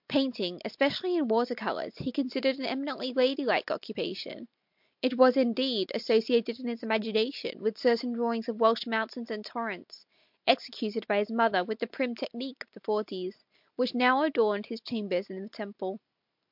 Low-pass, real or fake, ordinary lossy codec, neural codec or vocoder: 5.4 kHz; real; MP3, 48 kbps; none